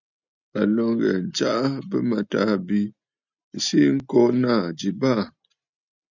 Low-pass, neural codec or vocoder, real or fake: 7.2 kHz; none; real